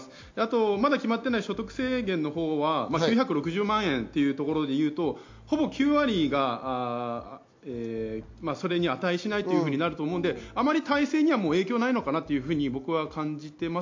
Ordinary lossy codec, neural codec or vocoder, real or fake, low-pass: MP3, 48 kbps; none; real; 7.2 kHz